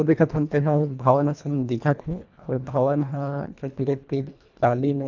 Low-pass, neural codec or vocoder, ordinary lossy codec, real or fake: 7.2 kHz; codec, 24 kHz, 1.5 kbps, HILCodec; none; fake